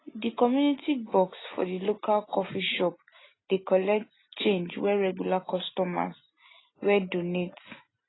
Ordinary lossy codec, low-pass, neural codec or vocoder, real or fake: AAC, 16 kbps; 7.2 kHz; none; real